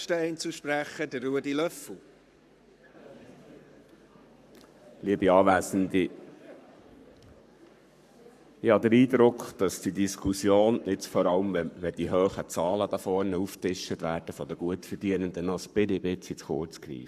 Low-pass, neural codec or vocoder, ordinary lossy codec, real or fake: 14.4 kHz; codec, 44.1 kHz, 7.8 kbps, Pupu-Codec; none; fake